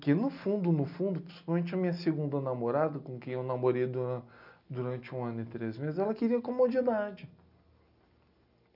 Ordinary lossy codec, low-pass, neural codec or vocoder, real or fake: none; 5.4 kHz; none; real